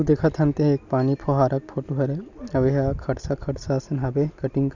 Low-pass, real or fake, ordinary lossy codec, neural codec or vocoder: 7.2 kHz; real; none; none